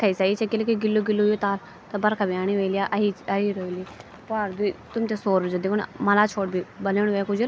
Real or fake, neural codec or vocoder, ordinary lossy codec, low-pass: real; none; none; none